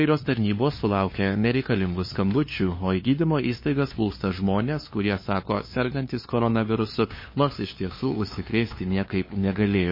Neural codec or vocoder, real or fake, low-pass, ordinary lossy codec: codec, 16 kHz, 2 kbps, FunCodec, trained on LibriTTS, 25 frames a second; fake; 5.4 kHz; MP3, 24 kbps